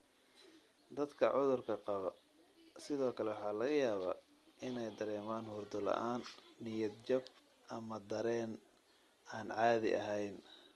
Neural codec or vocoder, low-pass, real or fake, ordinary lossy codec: none; 19.8 kHz; real; Opus, 24 kbps